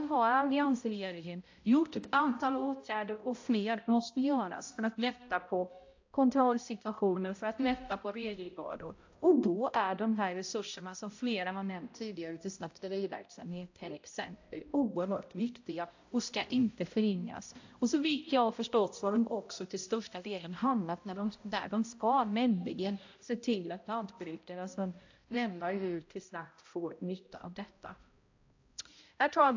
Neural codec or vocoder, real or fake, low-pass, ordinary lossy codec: codec, 16 kHz, 0.5 kbps, X-Codec, HuBERT features, trained on balanced general audio; fake; 7.2 kHz; AAC, 48 kbps